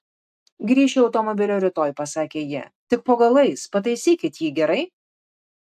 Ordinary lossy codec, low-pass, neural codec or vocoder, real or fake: AAC, 96 kbps; 14.4 kHz; none; real